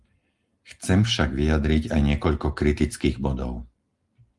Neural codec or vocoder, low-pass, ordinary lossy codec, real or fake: none; 10.8 kHz; Opus, 24 kbps; real